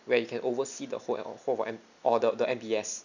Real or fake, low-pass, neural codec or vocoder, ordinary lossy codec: real; 7.2 kHz; none; none